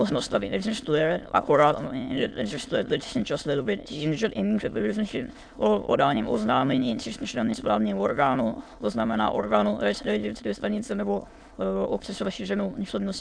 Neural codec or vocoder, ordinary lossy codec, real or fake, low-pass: autoencoder, 22.05 kHz, a latent of 192 numbers a frame, VITS, trained on many speakers; AAC, 64 kbps; fake; 9.9 kHz